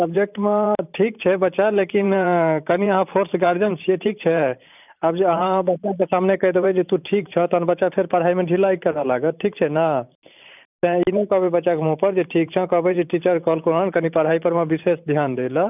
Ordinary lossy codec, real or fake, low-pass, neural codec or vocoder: none; fake; 3.6 kHz; vocoder, 44.1 kHz, 128 mel bands every 512 samples, BigVGAN v2